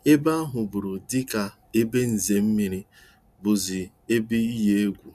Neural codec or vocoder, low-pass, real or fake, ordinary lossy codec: none; 14.4 kHz; real; none